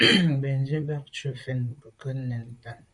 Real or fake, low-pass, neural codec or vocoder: fake; 10.8 kHz; vocoder, 44.1 kHz, 128 mel bands, Pupu-Vocoder